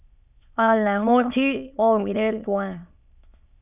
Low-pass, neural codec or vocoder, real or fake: 3.6 kHz; autoencoder, 22.05 kHz, a latent of 192 numbers a frame, VITS, trained on many speakers; fake